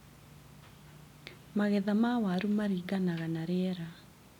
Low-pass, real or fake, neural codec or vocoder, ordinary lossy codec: 19.8 kHz; real; none; none